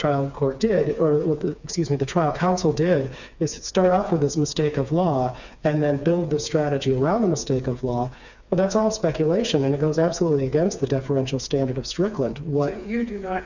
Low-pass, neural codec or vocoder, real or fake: 7.2 kHz; codec, 16 kHz, 4 kbps, FreqCodec, smaller model; fake